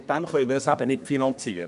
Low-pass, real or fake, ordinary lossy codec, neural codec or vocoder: 10.8 kHz; fake; none; codec, 24 kHz, 1 kbps, SNAC